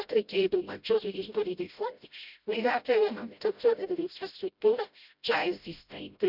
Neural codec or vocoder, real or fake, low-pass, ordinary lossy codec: codec, 16 kHz, 0.5 kbps, FreqCodec, smaller model; fake; 5.4 kHz; none